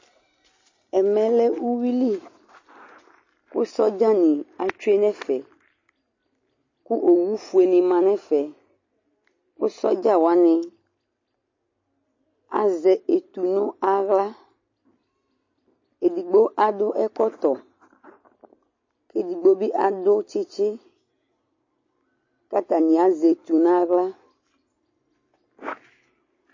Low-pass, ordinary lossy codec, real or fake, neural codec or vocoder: 7.2 kHz; MP3, 32 kbps; real; none